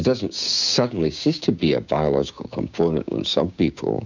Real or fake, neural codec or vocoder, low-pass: fake; codec, 16 kHz, 6 kbps, DAC; 7.2 kHz